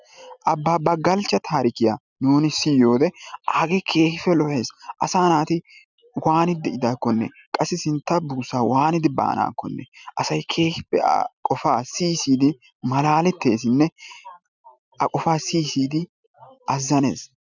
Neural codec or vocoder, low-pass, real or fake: none; 7.2 kHz; real